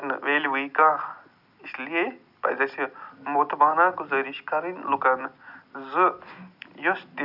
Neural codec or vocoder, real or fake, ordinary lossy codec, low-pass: none; real; none; 5.4 kHz